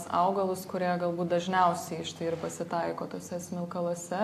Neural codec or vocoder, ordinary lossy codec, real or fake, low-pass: none; AAC, 64 kbps; real; 14.4 kHz